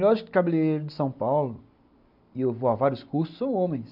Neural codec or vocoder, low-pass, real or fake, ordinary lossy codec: none; 5.4 kHz; real; none